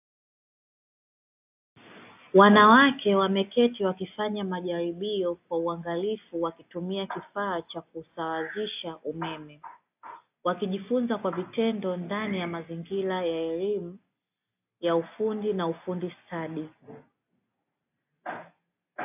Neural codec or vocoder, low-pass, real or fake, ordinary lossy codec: none; 3.6 kHz; real; AAC, 32 kbps